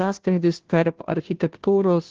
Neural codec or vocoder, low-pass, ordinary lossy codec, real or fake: codec, 16 kHz, 0.5 kbps, FunCodec, trained on Chinese and English, 25 frames a second; 7.2 kHz; Opus, 16 kbps; fake